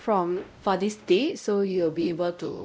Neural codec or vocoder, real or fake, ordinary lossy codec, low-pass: codec, 16 kHz, 0.5 kbps, X-Codec, WavLM features, trained on Multilingual LibriSpeech; fake; none; none